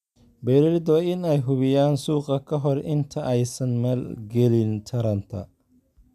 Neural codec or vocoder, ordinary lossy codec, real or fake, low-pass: none; none; real; 14.4 kHz